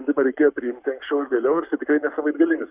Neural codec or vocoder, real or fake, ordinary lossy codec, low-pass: codec, 44.1 kHz, 7.8 kbps, Pupu-Codec; fake; Opus, 64 kbps; 3.6 kHz